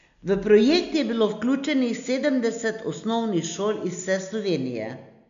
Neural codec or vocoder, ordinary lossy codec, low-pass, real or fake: none; AAC, 96 kbps; 7.2 kHz; real